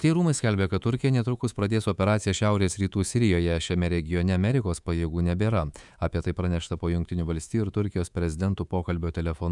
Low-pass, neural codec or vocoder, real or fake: 10.8 kHz; codec, 24 kHz, 3.1 kbps, DualCodec; fake